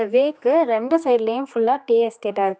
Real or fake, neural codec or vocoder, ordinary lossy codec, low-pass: fake; codec, 16 kHz, 2 kbps, X-Codec, HuBERT features, trained on general audio; none; none